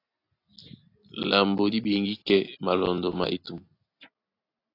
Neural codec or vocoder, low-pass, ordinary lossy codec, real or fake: none; 5.4 kHz; AAC, 32 kbps; real